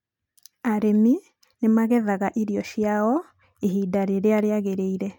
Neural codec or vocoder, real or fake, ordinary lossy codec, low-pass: none; real; MP3, 96 kbps; 19.8 kHz